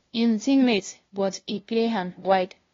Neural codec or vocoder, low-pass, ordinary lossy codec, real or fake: codec, 16 kHz, 0.5 kbps, FunCodec, trained on LibriTTS, 25 frames a second; 7.2 kHz; AAC, 32 kbps; fake